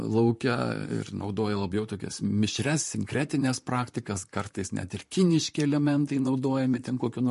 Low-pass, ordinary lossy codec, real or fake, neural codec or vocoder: 14.4 kHz; MP3, 48 kbps; fake; vocoder, 44.1 kHz, 128 mel bands, Pupu-Vocoder